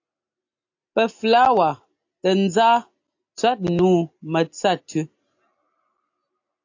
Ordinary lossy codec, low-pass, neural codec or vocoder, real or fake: AAC, 48 kbps; 7.2 kHz; none; real